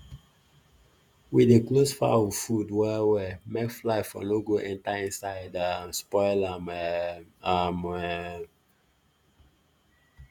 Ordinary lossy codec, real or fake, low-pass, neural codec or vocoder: none; fake; 19.8 kHz; vocoder, 48 kHz, 128 mel bands, Vocos